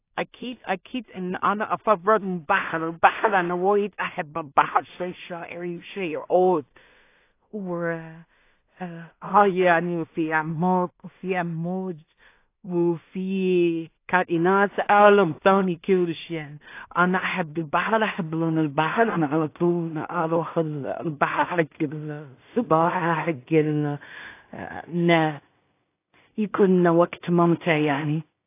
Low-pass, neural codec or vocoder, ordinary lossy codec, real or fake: 3.6 kHz; codec, 16 kHz in and 24 kHz out, 0.4 kbps, LongCat-Audio-Codec, two codebook decoder; AAC, 24 kbps; fake